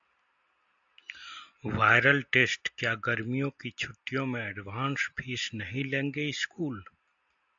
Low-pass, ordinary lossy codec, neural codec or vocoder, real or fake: 7.2 kHz; AAC, 64 kbps; none; real